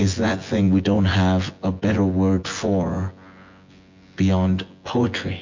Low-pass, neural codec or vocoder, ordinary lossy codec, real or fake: 7.2 kHz; vocoder, 24 kHz, 100 mel bands, Vocos; MP3, 48 kbps; fake